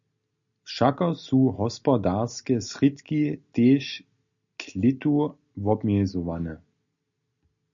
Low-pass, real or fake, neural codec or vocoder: 7.2 kHz; real; none